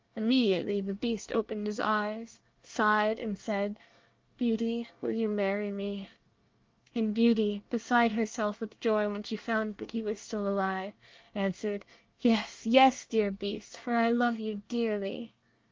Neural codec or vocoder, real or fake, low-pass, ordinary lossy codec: codec, 24 kHz, 1 kbps, SNAC; fake; 7.2 kHz; Opus, 24 kbps